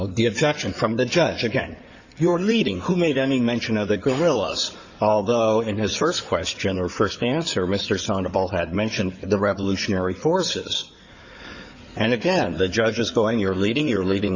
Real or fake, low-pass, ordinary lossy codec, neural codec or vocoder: fake; 7.2 kHz; Opus, 64 kbps; codec, 16 kHz in and 24 kHz out, 2.2 kbps, FireRedTTS-2 codec